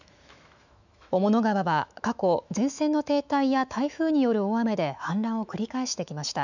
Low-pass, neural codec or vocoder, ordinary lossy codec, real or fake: 7.2 kHz; autoencoder, 48 kHz, 128 numbers a frame, DAC-VAE, trained on Japanese speech; none; fake